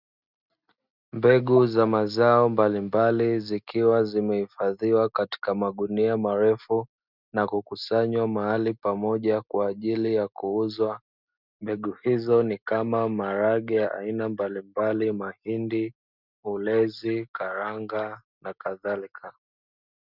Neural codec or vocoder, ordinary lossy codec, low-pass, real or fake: none; Opus, 64 kbps; 5.4 kHz; real